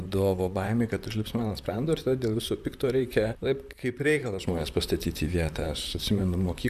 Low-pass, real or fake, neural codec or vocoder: 14.4 kHz; fake; vocoder, 44.1 kHz, 128 mel bands, Pupu-Vocoder